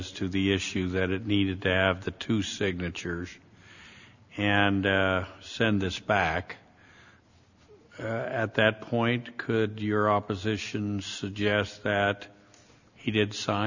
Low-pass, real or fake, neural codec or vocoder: 7.2 kHz; real; none